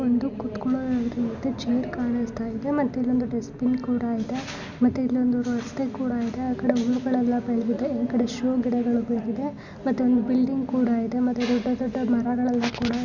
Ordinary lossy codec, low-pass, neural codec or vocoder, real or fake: Opus, 64 kbps; 7.2 kHz; none; real